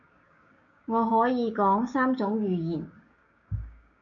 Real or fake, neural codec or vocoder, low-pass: fake; codec, 16 kHz, 6 kbps, DAC; 7.2 kHz